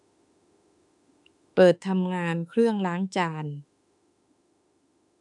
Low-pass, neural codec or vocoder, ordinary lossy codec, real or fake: 10.8 kHz; autoencoder, 48 kHz, 32 numbers a frame, DAC-VAE, trained on Japanese speech; none; fake